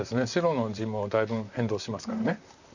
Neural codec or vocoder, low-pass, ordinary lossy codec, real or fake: vocoder, 44.1 kHz, 128 mel bands, Pupu-Vocoder; 7.2 kHz; none; fake